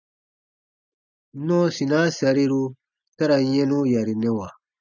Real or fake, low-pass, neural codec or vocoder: real; 7.2 kHz; none